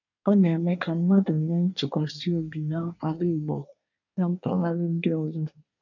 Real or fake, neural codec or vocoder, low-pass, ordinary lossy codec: fake; codec, 24 kHz, 1 kbps, SNAC; 7.2 kHz; none